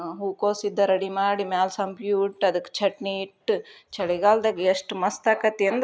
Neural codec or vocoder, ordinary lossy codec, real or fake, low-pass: none; none; real; none